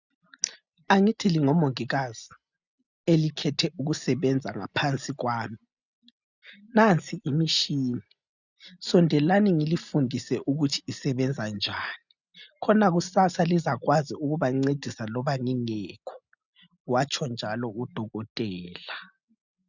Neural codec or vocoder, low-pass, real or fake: none; 7.2 kHz; real